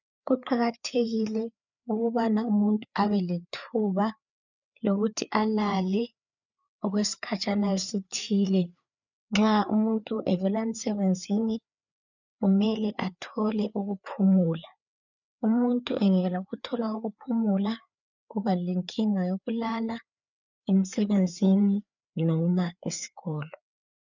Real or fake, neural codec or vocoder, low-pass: fake; codec, 16 kHz, 4 kbps, FreqCodec, larger model; 7.2 kHz